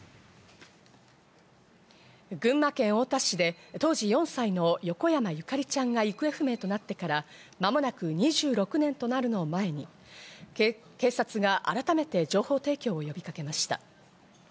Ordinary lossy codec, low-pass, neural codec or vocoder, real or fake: none; none; none; real